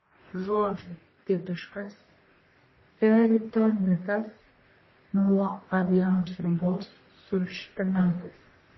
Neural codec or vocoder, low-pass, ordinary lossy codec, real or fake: codec, 44.1 kHz, 1.7 kbps, Pupu-Codec; 7.2 kHz; MP3, 24 kbps; fake